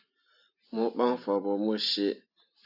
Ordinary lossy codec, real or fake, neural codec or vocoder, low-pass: AAC, 48 kbps; real; none; 5.4 kHz